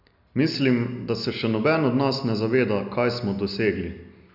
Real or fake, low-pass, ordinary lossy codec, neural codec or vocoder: real; 5.4 kHz; none; none